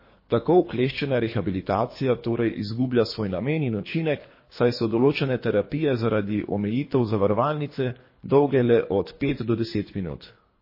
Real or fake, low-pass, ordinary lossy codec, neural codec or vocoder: fake; 5.4 kHz; MP3, 24 kbps; codec, 24 kHz, 3 kbps, HILCodec